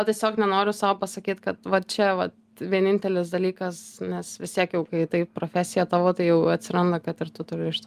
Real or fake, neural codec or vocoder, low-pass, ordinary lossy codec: real; none; 14.4 kHz; Opus, 32 kbps